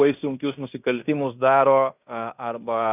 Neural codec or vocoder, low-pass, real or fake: codec, 16 kHz in and 24 kHz out, 0.9 kbps, LongCat-Audio-Codec, fine tuned four codebook decoder; 3.6 kHz; fake